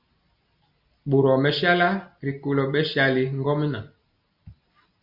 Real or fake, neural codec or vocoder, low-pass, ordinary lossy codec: real; none; 5.4 kHz; Opus, 64 kbps